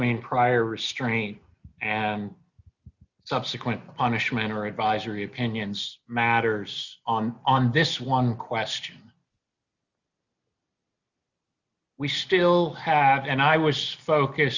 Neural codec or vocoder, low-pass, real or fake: none; 7.2 kHz; real